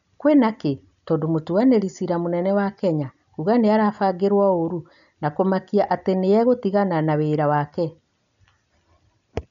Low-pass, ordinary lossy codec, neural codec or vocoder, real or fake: 7.2 kHz; none; none; real